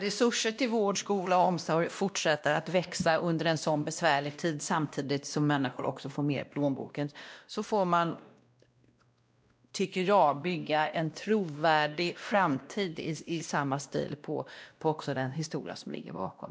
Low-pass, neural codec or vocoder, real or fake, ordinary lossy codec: none; codec, 16 kHz, 1 kbps, X-Codec, WavLM features, trained on Multilingual LibriSpeech; fake; none